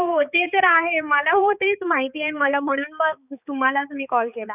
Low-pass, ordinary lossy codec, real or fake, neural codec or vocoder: 3.6 kHz; none; fake; codec, 16 kHz, 4 kbps, X-Codec, HuBERT features, trained on balanced general audio